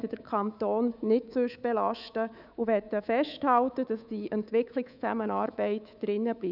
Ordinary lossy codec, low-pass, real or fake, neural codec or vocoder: none; 5.4 kHz; fake; codec, 24 kHz, 3.1 kbps, DualCodec